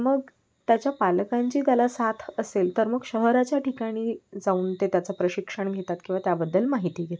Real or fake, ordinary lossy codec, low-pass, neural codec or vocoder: real; none; none; none